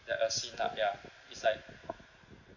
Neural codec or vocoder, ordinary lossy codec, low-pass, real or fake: none; none; 7.2 kHz; real